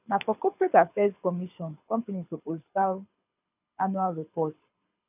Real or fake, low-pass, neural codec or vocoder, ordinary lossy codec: fake; 3.6 kHz; codec, 24 kHz, 6 kbps, HILCodec; none